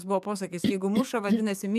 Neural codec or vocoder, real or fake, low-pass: codec, 44.1 kHz, 7.8 kbps, DAC; fake; 14.4 kHz